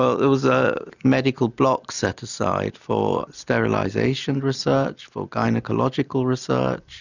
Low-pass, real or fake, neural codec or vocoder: 7.2 kHz; real; none